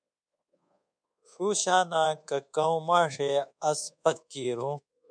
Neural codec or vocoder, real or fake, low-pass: codec, 24 kHz, 1.2 kbps, DualCodec; fake; 9.9 kHz